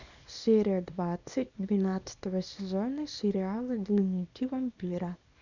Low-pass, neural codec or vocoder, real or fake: 7.2 kHz; codec, 24 kHz, 0.9 kbps, WavTokenizer, small release; fake